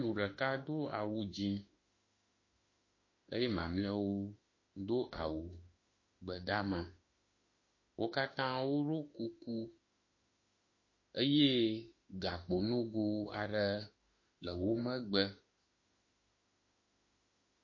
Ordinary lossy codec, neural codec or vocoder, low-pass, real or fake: MP3, 32 kbps; autoencoder, 48 kHz, 32 numbers a frame, DAC-VAE, trained on Japanese speech; 7.2 kHz; fake